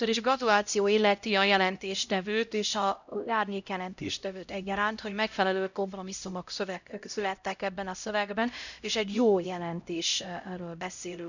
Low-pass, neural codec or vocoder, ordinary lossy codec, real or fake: 7.2 kHz; codec, 16 kHz, 0.5 kbps, X-Codec, HuBERT features, trained on LibriSpeech; none; fake